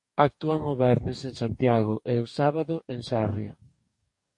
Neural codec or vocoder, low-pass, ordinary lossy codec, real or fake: codec, 44.1 kHz, 2.6 kbps, DAC; 10.8 kHz; MP3, 48 kbps; fake